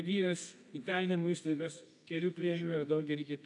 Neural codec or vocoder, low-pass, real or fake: codec, 24 kHz, 0.9 kbps, WavTokenizer, medium music audio release; 10.8 kHz; fake